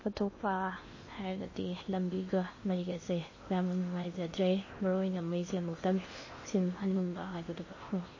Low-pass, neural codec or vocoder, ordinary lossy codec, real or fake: 7.2 kHz; codec, 16 kHz in and 24 kHz out, 0.8 kbps, FocalCodec, streaming, 65536 codes; MP3, 32 kbps; fake